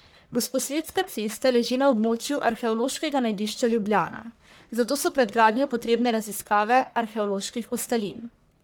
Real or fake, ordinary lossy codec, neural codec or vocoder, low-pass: fake; none; codec, 44.1 kHz, 1.7 kbps, Pupu-Codec; none